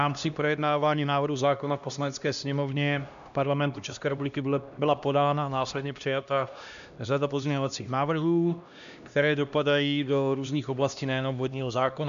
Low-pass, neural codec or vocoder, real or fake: 7.2 kHz; codec, 16 kHz, 1 kbps, X-Codec, HuBERT features, trained on LibriSpeech; fake